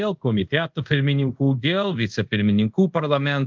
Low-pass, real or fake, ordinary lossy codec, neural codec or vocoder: 7.2 kHz; fake; Opus, 24 kbps; codec, 24 kHz, 0.5 kbps, DualCodec